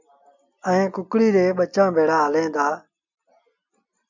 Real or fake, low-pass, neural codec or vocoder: real; 7.2 kHz; none